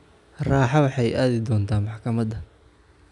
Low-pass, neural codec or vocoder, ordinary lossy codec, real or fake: 10.8 kHz; none; none; real